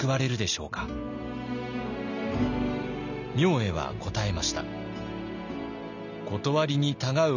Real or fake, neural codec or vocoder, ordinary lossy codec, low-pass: real; none; none; 7.2 kHz